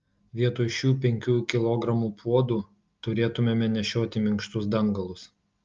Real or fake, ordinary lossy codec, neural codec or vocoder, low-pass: real; Opus, 24 kbps; none; 7.2 kHz